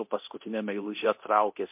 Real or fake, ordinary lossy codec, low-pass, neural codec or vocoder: fake; MP3, 32 kbps; 3.6 kHz; codec, 24 kHz, 0.9 kbps, DualCodec